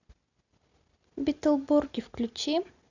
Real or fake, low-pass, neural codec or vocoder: real; 7.2 kHz; none